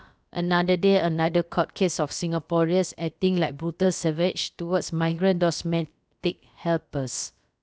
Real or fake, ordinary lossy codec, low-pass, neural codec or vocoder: fake; none; none; codec, 16 kHz, about 1 kbps, DyCAST, with the encoder's durations